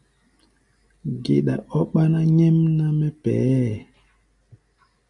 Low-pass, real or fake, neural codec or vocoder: 10.8 kHz; real; none